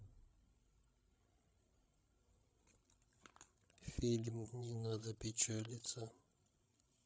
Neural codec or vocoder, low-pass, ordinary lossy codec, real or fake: codec, 16 kHz, 16 kbps, FreqCodec, larger model; none; none; fake